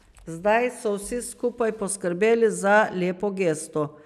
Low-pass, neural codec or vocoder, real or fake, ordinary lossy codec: 14.4 kHz; none; real; none